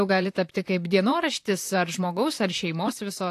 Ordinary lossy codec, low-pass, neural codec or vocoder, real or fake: AAC, 64 kbps; 14.4 kHz; vocoder, 44.1 kHz, 128 mel bands, Pupu-Vocoder; fake